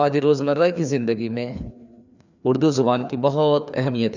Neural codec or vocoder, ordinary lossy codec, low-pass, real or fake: codec, 16 kHz, 2 kbps, FreqCodec, larger model; none; 7.2 kHz; fake